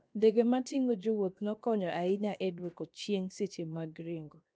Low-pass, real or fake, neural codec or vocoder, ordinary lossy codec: none; fake; codec, 16 kHz, 0.7 kbps, FocalCodec; none